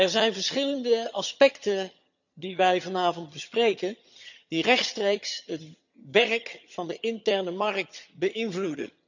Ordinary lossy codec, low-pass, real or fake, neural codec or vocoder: none; 7.2 kHz; fake; vocoder, 22.05 kHz, 80 mel bands, HiFi-GAN